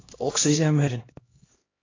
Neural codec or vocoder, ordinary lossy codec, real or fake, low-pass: codec, 16 kHz, 1 kbps, X-Codec, HuBERT features, trained on LibriSpeech; AAC, 32 kbps; fake; 7.2 kHz